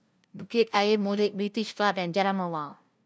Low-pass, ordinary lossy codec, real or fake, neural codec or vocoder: none; none; fake; codec, 16 kHz, 0.5 kbps, FunCodec, trained on LibriTTS, 25 frames a second